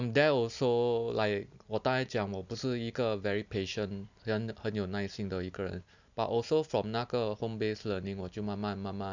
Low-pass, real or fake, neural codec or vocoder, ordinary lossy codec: 7.2 kHz; real; none; none